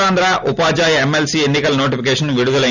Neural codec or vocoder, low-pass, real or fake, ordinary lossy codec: none; 7.2 kHz; real; none